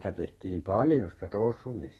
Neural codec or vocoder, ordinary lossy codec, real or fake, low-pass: codec, 32 kHz, 1.9 kbps, SNAC; AAC, 32 kbps; fake; 14.4 kHz